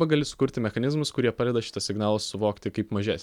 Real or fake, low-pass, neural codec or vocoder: real; 19.8 kHz; none